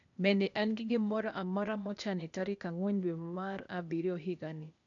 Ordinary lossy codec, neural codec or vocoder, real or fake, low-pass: none; codec, 16 kHz, 0.8 kbps, ZipCodec; fake; 7.2 kHz